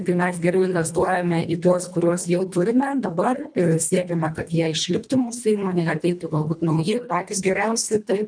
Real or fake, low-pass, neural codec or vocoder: fake; 9.9 kHz; codec, 24 kHz, 1.5 kbps, HILCodec